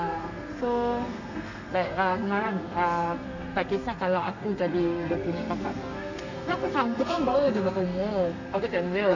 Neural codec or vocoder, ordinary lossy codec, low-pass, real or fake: codec, 32 kHz, 1.9 kbps, SNAC; none; 7.2 kHz; fake